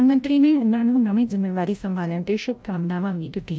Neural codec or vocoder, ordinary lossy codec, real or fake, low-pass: codec, 16 kHz, 0.5 kbps, FreqCodec, larger model; none; fake; none